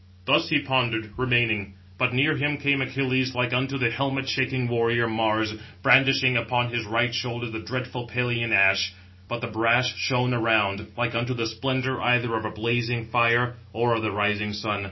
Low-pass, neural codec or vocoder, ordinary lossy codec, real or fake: 7.2 kHz; none; MP3, 24 kbps; real